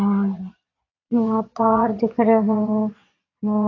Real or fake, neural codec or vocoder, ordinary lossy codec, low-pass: fake; vocoder, 22.05 kHz, 80 mel bands, Vocos; AAC, 32 kbps; 7.2 kHz